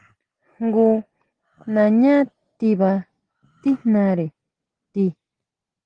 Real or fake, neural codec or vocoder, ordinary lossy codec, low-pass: real; none; Opus, 16 kbps; 9.9 kHz